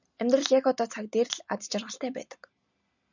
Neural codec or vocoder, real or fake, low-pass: none; real; 7.2 kHz